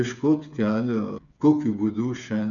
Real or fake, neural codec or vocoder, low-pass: fake; codec, 16 kHz, 8 kbps, FreqCodec, smaller model; 7.2 kHz